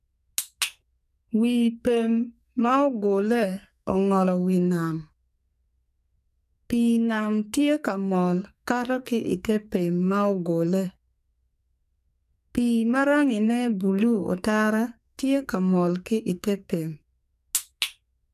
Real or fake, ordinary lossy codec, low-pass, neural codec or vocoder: fake; none; 14.4 kHz; codec, 44.1 kHz, 2.6 kbps, SNAC